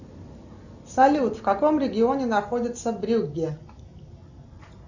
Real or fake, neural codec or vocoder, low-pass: real; none; 7.2 kHz